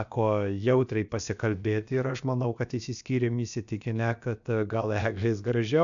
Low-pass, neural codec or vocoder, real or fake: 7.2 kHz; codec, 16 kHz, about 1 kbps, DyCAST, with the encoder's durations; fake